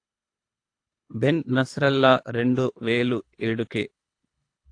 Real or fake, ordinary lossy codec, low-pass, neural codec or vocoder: fake; AAC, 48 kbps; 9.9 kHz; codec, 24 kHz, 3 kbps, HILCodec